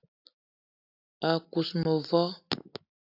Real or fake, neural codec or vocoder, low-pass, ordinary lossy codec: real; none; 5.4 kHz; AAC, 48 kbps